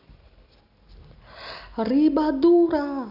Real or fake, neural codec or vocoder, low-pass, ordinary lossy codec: real; none; 5.4 kHz; none